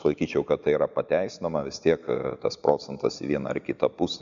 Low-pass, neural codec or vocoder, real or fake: 7.2 kHz; none; real